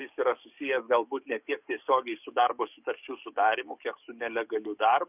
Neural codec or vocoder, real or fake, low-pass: codec, 44.1 kHz, 7.8 kbps, DAC; fake; 3.6 kHz